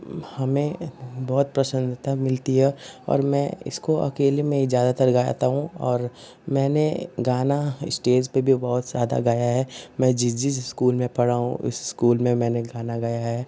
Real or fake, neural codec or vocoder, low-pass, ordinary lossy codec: real; none; none; none